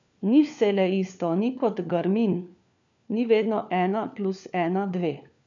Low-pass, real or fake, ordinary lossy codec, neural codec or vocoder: 7.2 kHz; fake; none; codec, 16 kHz, 4 kbps, FunCodec, trained on LibriTTS, 50 frames a second